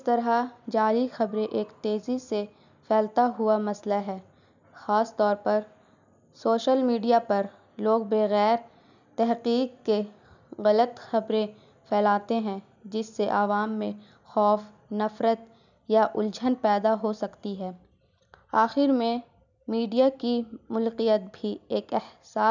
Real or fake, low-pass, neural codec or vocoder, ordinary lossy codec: real; 7.2 kHz; none; none